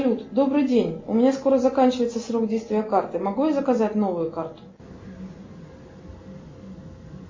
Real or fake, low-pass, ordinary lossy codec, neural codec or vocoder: real; 7.2 kHz; MP3, 32 kbps; none